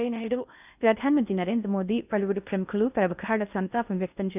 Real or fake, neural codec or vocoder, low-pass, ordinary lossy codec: fake; codec, 16 kHz in and 24 kHz out, 0.6 kbps, FocalCodec, streaming, 2048 codes; 3.6 kHz; none